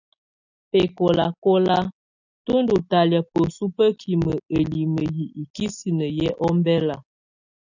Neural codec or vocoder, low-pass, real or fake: none; 7.2 kHz; real